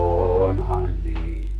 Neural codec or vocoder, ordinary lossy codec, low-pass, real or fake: codec, 32 kHz, 1.9 kbps, SNAC; none; 14.4 kHz; fake